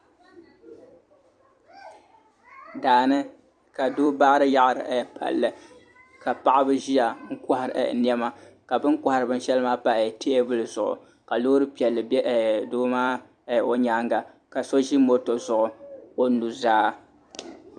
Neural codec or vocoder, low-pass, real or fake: vocoder, 44.1 kHz, 128 mel bands every 256 samples, BigVGAN v2; 9.9 kHz; fake